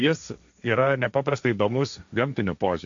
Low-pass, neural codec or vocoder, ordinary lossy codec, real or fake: 7.2 kHz; codec, 16 kHz, 1.1 kbps, Voila-Tokenizer; AAC, 64 kbps; fake